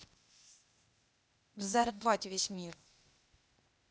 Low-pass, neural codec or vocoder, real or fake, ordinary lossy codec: none; codec, 16 kHz, 0.8 kbps, ZipCodec; fake; none